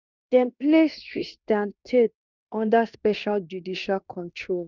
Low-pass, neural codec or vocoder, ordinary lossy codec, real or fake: 7.2 kHz; codec, 16 kHz in and 24 kHz out, 0.9 kbps, LongCat-Audio-Codec, fine tuned four codebook decoder; none; fake